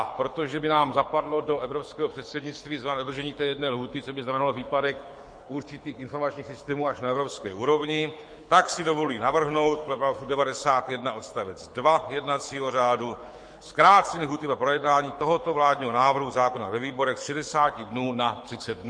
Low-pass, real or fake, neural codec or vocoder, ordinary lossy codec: 9.9 kHz; fake; codec, 24 kHz, 6 kbps, HILCodec; MP3, 48 kbps